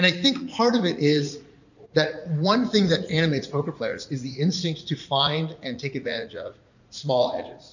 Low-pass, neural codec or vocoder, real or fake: 7.2 kHz; vocoder, 44.1 kHz, 80 mel bands, Vocos; fake